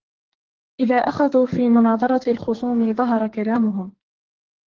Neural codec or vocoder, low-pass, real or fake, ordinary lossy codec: codec, 44.1 kHz, 2.6 kbps, SNAC; 7.2 kHz; fake; Opus, 16 kbps